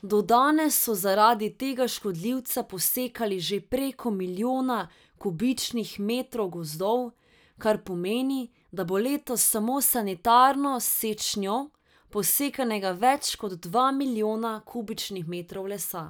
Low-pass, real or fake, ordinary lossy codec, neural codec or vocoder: none; real; none; none